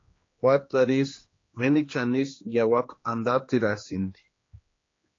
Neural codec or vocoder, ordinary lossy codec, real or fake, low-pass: codec, 16 kHz, 2 kbps, X-Codec, HuBERT features, trained on general audio; AAC, 32 kbps; fake; 7.2 kHz